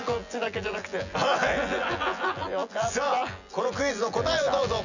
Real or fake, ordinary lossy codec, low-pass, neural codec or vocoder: fake; none; 7.2 kHz; vocoder, 24 kHz, 100 mel bands, Vocos